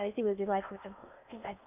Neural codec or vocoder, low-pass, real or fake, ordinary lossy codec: codec, 16 kHz in and 24 kHz out, 0.6 kbps, FocalCodec, streaming, 4096 codes; 3.6 kHz; fake; none